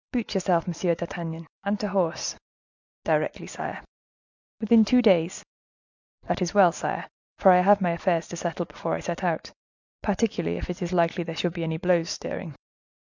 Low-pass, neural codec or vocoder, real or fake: 7.2 kHz; none; real